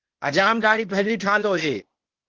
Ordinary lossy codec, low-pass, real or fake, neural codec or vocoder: Opus, 16 kbps; 7.2 kHz; fake; codec, 16 kHz, 0.8 kbps, ZipCodec